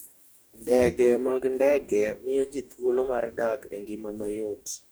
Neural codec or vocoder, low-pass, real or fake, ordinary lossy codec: codec, 44.1 kHz, 2.6 kbps, DAC; none; fake; none